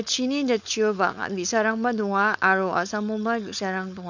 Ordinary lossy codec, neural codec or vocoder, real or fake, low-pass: none; codec, 16 kHz, 4.8 kbps, FACodec; fake; 7.2 kHz